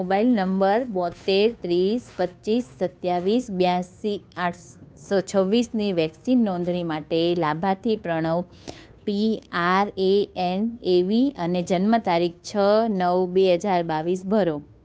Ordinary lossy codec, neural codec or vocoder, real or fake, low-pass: none; codec, 16 kHz, 2 kbps, FunCodec, trained on Chinese and English, 25 frames a second; fake; none